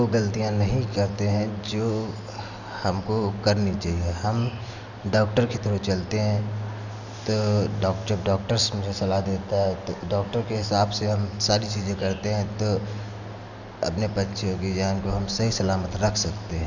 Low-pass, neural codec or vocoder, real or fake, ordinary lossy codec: 7.2 kHz; none; real; none